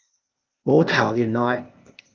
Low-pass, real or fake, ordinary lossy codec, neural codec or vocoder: 7.2 kHz; fake; Opus, 24 kbps; codec, 16 kHz, 0.8 kbps, ZipCodec